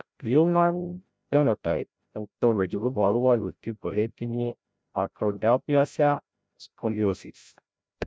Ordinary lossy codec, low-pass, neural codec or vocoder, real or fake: none; none; codec, 16 kHz, 0.5 kbps, FreqCodec, larger model; fake